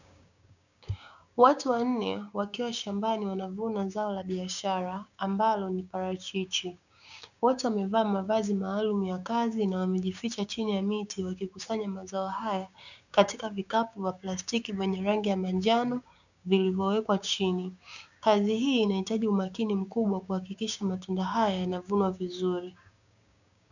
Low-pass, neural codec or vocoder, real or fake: 7.2 kHz; codec, 16 kHz, 6 kbps, DAC; fake